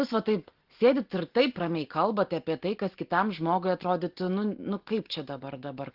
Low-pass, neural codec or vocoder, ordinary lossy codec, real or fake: 5.4 kHz; none; Opus, 16 kbps; real